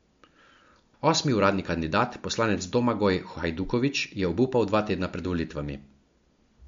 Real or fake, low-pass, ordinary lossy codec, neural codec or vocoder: real; 7.2 kHz; MP3, 48 kbps; none